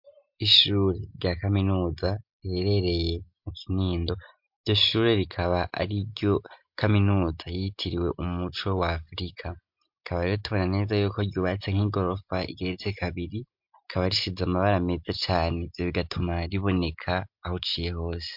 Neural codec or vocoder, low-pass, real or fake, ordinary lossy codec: none; 5.4 kHz; real; MP3, 48 kbps